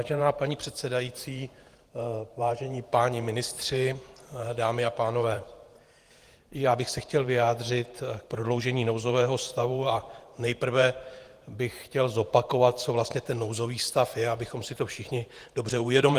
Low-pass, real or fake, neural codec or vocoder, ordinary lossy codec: 14.4 kHz; fake; vocoder, 48 kHz, 128 mel bands, Vocos; Opus, 24 kbps